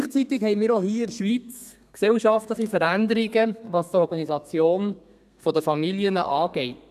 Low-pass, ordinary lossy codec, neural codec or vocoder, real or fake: 14.4 kHz; none; codec, 32 kHz, 1.9 kbps, SNAC; fake